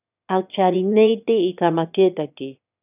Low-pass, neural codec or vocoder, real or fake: 3.6 kHz; autoencoder, 22.05 kHz, a latent of 192 numbers a frame, VITS, trained on one speaker; fake